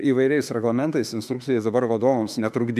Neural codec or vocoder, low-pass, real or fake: autoencoder, 48 kHz, 32 numbers a frame, DAC-VAE, trained on Japanese speech; 14.4 kHz; fake